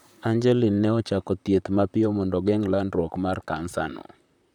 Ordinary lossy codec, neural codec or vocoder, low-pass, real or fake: none; vocoder, 44.1 kHz, 128 mel bands, Pupu-Vocoder; 19.8 kHz; fake